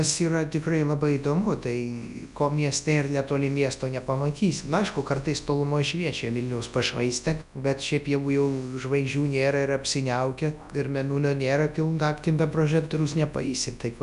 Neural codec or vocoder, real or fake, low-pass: codec, 24 kHz, 0.9 kbps, WavTokenizer, large speech release; fake; 10.8 kHz